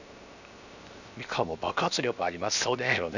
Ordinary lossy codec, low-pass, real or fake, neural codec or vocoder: none; 7.2 kHz; fake; codec, 16 kHz, 0.7 kbps, FocalCodec